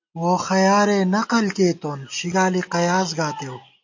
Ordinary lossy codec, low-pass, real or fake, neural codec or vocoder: AAC, 48 kbps; 7.2 kHz; real; none